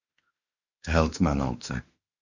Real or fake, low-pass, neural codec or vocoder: fake; 7.2 kHz; autoencoder, 48 kHz, 32 numbers a frame, DAC-VAE, trained on Japanese speech